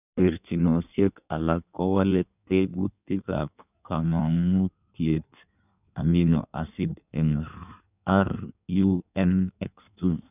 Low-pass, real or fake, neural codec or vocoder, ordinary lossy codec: 3.6 kHz; fake; codec, 16 kHz in and 24 kHz out, 1.1 kbps, FireRedTTS-2 codec; none